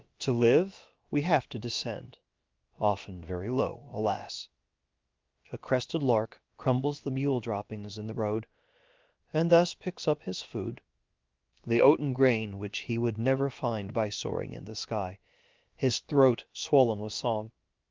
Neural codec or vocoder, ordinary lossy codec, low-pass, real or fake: codec, 16 kHz, about 1 kbps, DyCAST, with the encoder's durations; Opus, 32 kbps; 7.2 kHz; fake